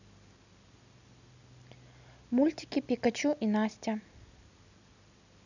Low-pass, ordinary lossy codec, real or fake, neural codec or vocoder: 7.2 kHz; none; real; none